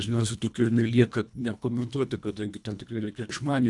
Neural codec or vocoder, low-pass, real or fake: codec, 24 kHz, 1.5 kbps, HILCodec; 10.8 kHz; fake